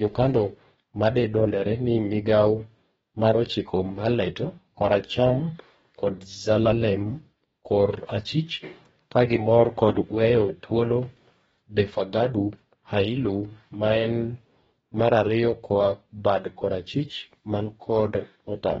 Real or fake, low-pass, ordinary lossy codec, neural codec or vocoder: fake; 19.8 kHz; AAC, 24 kbps; codec, 44.1 kHz, 2.6 kbps, DAC